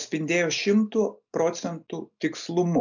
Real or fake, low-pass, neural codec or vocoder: real; 7.2 kHz; none